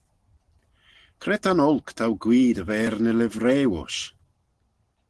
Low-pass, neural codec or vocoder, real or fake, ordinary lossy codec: 10.8 kHz; none; real; Opus, 16 kbps